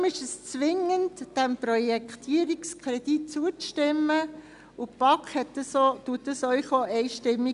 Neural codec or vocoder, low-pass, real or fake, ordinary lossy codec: none; 10.8 kHz; real; none